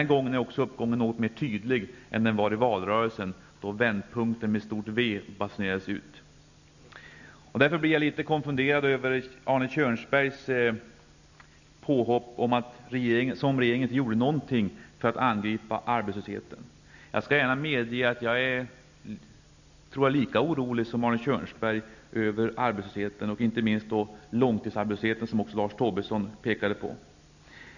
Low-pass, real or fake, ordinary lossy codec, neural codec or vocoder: 7.2 kHz; real; none; none